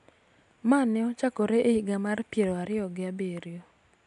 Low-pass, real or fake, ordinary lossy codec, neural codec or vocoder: 10.8 kHz; real; none; none